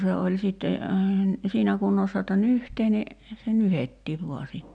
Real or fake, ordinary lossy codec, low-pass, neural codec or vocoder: real; none; 9.9 kHz; none